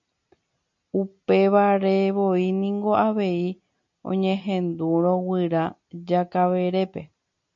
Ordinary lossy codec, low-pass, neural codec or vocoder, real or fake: MP3, 64 kbps; 7.2 kHz; none; real